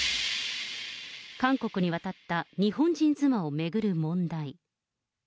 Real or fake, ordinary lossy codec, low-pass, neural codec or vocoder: real; none; none; none